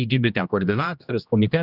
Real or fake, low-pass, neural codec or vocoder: fake; 5.4 kHz; codec, 16 kHz, 1 kbps, X-Codec, HuBERT features, trained on general audio